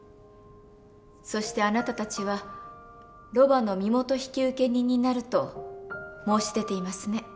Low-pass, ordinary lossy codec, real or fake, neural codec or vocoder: none; none; real; none